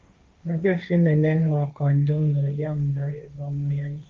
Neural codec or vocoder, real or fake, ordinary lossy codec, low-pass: codec, 16 kHz, 1.1 kbps, Voila-Tokenizer; fake; Opus, 24 kbps; 7.2 kHz